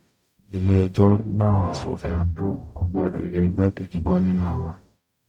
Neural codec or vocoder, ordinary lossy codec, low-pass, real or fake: codec, 44.1 kHz, 0.9 kbps, DAC; none; 19.8 kHz; fake